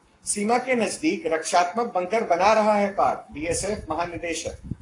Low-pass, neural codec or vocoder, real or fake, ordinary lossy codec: 10.8 kHz; codec, 44.1 kHz, 7.8 kbps, Pupu-Codec; fake; AAC, 48 kbps